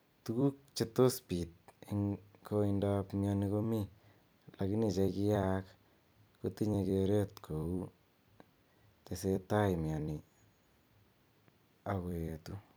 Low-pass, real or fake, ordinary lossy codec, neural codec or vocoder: none; fake; none; vocoder, 44.1 kHz, 128 mel bands every 256 samples, BigVGAN v2